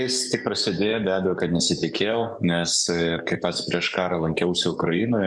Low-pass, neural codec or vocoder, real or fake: 10.8 kHz; codec, 44.1 kHz, 7.8 kbps, DAC; fake